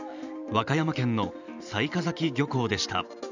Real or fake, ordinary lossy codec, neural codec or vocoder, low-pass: fake; none; vocoder, 44.1 kHz, 128 mel bands every 512 samples, BigVGAN v2; 7.2 kHz